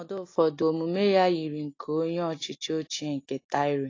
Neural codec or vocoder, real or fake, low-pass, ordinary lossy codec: none; real; 7.2 kHz; AAC, 32 kbps